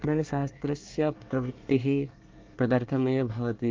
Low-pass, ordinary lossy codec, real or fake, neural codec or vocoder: 7.2 kHz; Opus, 32 kbps; fake; codec, 24 kHz, 1 kbps, SNAC